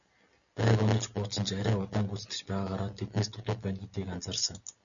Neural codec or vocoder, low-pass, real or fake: none; 7.2 kHz; real